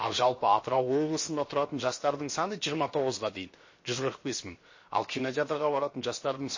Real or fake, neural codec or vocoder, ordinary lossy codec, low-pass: fake; codec, 16 kHz, 0.7 kbps, FocalCodec; MP3, 32 kbps; 7.2 kHz